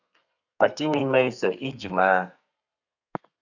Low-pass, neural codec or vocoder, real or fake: 7.2 kHz; codec, 32 kHz, 1.9 kbps, SNAC; fake